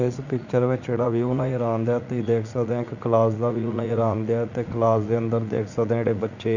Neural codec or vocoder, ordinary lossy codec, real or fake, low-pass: vocoder, 44.1 kHz, 80 mel bands, Vocos; none; fake; 7.2 kHz